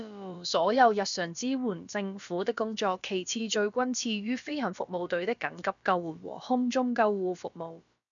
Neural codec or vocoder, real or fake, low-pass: codec, 16 kHz, about 1 kbps, DyCAST, with the encoder's durations; fake; 7.2 kHz